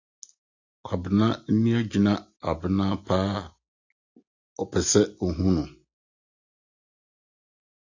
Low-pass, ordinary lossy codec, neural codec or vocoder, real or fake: 7.2 kHz; AAC, 48 kbps; none; real